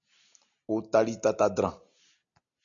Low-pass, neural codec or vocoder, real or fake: 7.2 kHz; none; real